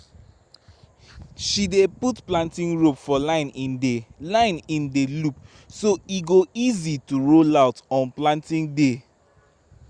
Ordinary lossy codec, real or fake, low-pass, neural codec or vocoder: Opus, 64 kbps; real; 9.9 kHz; none